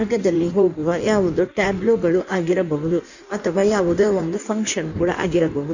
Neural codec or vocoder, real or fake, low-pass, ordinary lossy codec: codec, 16 kHz in and 24 kHz out, 1.1 kbps, FireRedTTS-2 codec; fake; 7.2 kHz; none